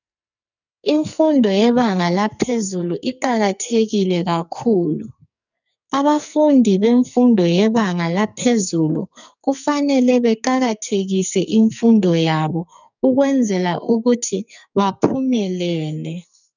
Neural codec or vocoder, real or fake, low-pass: codec, 44.1 kHz, 2.6 kbps, SNAC; fake; 7.2 kHz